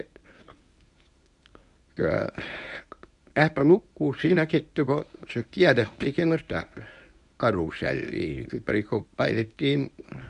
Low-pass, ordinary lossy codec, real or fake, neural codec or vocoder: 10.8 kHz; none; fake; codec, 24 kHz, 0.9 kbps, WavTokenizer, medium speech release version 1